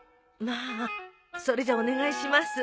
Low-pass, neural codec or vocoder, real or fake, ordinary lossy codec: none; none; real; none